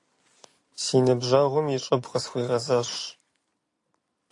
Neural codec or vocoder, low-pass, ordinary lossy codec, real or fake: vocoder, 44.1 kHz, 128 mel bands every 256 samples, BigVGAN v2; 10.8 kHz; AAC, 48 kbps; fake